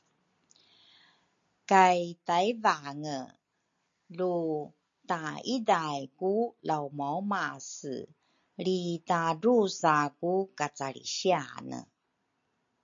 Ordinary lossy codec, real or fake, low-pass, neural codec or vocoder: MP3, 48 kbps; real; 7.2 kHz; none